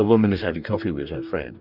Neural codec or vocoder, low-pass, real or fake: codec, 44.1 kHz, 2.6 kbps, DAC; 5.4 kHz; fake